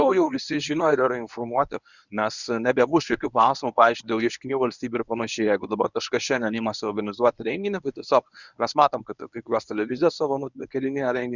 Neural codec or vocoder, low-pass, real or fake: codec, 24 kHz, 0.9 kbps, WavTokenizer, medium speech release version 1; 7.2 kHz; fake